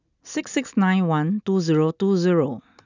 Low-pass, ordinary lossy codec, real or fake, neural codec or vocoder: 7.2 kHz; none; real; none